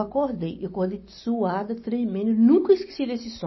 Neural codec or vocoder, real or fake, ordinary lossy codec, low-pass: none; real; MP3, 24 kbps; 7.2 kHz